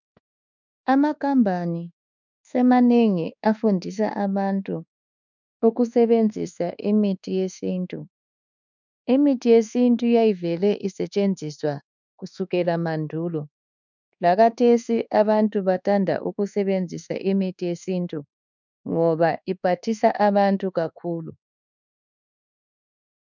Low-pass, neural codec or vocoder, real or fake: 7.2 kHz; codec, 24 kHz, 1.2 kbps, DualCodec; fake